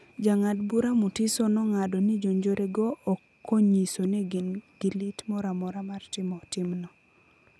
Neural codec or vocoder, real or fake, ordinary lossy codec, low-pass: none; real; none; none